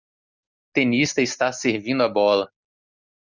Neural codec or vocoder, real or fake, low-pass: none; real; 7.2 kHz